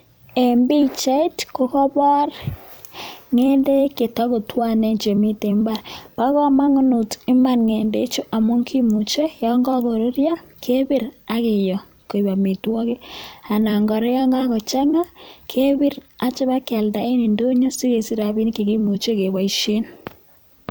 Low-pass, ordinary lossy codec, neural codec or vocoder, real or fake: none; none; vocoder, 44.1 kHz, 128 mel bands every 256 samples, BigVGAN v2; fake